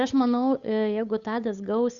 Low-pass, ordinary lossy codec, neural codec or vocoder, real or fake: 7.2 kHz; Opus, 64 kbps; codec, 16 kHz, 8 kbps, FunCodec, trained on Chinese and English, 25 frames a second; fake